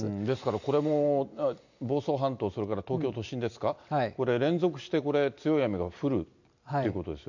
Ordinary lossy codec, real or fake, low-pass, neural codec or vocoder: none; real; 7.2 kHz; none